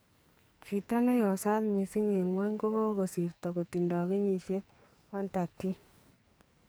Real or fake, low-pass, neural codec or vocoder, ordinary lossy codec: fake; none; codec, 44.1 kHz, 2.6 kbps, SNAC; none